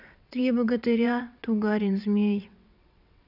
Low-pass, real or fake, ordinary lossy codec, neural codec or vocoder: 5.4 kHz; fake; none; vocoder, 22.05 kHz, 80 mel bands, WaveNeXt